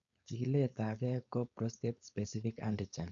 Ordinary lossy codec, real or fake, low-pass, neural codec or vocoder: none; fake; 7.2 kHz; codec, 16 kHz, 4.8 kbps, FACodec